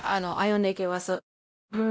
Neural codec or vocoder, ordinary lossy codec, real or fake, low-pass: codec, 16 kHz, 0.5 kbps, X-Codec, WavLM features, trained on Multilingual LibriSpeech; none; fake; none